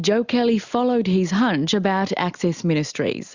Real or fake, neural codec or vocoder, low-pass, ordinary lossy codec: real; none; 7.2 kHz; Opus, 64 kbps